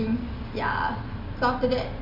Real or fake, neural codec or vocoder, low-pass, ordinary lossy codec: real; none; 5.4 kHz; MP3, 48 kbps